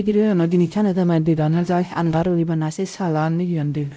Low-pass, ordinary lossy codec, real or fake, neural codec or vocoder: none; none; fake; codec, 16 kHz, 0.5 kbps, X-Codec, WavLM features, trained on Multilingual LibriSpeech